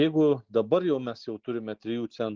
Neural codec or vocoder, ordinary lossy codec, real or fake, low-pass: none; Opus, 32 kbps; real; 7.2 kHz